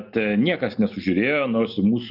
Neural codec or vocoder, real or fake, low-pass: none; real; 5.4 kHz